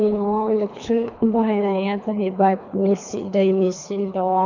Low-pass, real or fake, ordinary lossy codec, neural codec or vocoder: 7.2 kHz; fake; none; codec, 24 kHz, 3 kbps, HILCodec